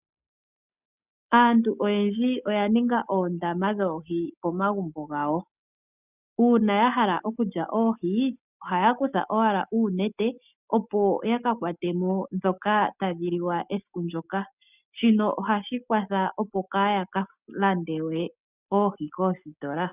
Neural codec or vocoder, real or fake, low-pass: none; real; 3.6 kHz